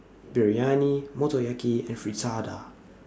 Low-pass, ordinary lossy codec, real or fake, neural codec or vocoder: none; none; real; none